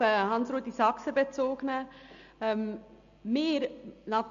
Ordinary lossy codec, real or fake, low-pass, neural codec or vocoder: MP3, 64 kbps; real; 7.2 kHz; none